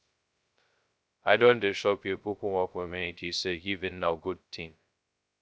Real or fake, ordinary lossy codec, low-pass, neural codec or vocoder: fake; none; none; codec, 16 kHz, 0.2 kbps, FocalCodec